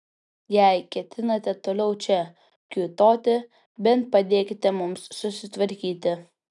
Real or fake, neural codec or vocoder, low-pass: real; none; 10.8 kHz